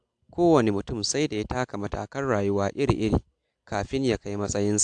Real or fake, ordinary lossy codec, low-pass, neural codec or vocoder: real; AAC, 64 kbps; 9.9 kHz; none